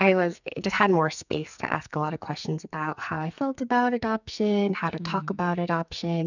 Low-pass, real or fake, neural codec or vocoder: 7.2 kHz; fake; codec, 44.1 kHz, 2.6 kbps, SNAC